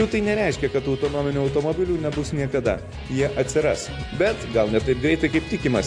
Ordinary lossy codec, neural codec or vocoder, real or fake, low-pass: AAC, 64 kbps; none; real; 9.9 kHz